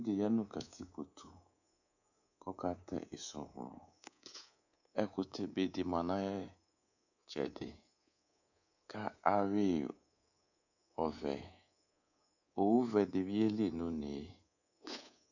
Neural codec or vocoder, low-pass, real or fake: codec, 24 kHz, 3.1 kbps, DualCodec; 7.2 kHz; fake